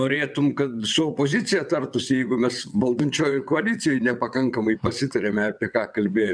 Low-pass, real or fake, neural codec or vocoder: 9.9 kHz; fake; vocoder, 22.05 kHz, 80 mel bands, WaveNeXt